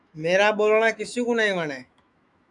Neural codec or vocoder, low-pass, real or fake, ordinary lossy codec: autoencoder, 48 kHz, 128 numbers a frame, DAC-VAE, trained on Japanese speech; 10.8 kHz; fake; MP3, 96 kbps